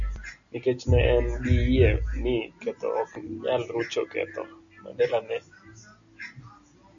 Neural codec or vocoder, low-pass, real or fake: none; 7.2 kHz; real